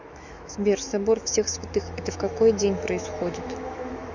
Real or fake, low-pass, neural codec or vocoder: fake; 7.2 kHz; autoencoder, 48 kHz, 128 numbers a frame, DAC-VAE, trained on Japanese speech